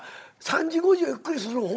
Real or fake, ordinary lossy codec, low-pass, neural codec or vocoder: fake; none; none; codec, 16 kHz, 16 kbps, FunCodec, trained on Chinese and English, 50 frames a second